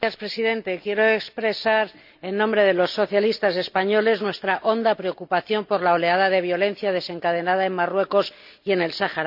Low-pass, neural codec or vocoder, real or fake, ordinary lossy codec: 5.4 kHz; none; real; none